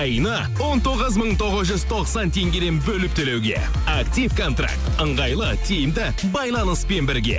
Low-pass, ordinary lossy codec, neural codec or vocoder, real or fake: none; none; none; real